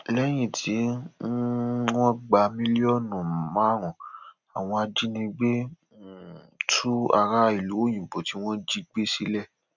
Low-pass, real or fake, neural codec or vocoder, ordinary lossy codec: 7.2 kHz; real; none; none